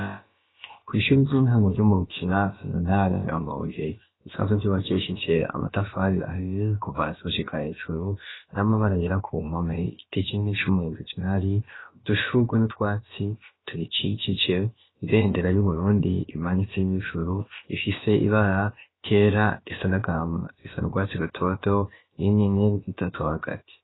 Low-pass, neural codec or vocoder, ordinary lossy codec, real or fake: 7.2 kHz; codec, 16 kHz, about 1 kbps, DyCAST, with the encoder's durations; AAC, 16 kbps; fake